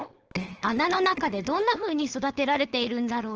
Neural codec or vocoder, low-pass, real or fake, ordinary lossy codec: codec, 16 kHz, 4.8 kbps, FACodec; 7.2 kHz; fake; Opus, 16 kbps